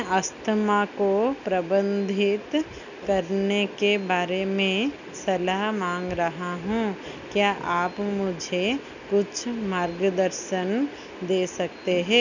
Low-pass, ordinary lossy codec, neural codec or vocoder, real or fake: 7.2 kHz; none; none; real